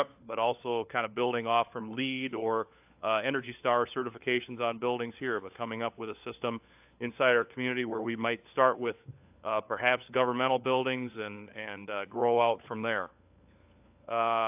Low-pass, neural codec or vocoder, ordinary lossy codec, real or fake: 3.6 kHz; codec, 16 kHz, 4 kbps, FunCodec, trained on LibriTTS, 50 frames a second; AAC, 32 kbps; fake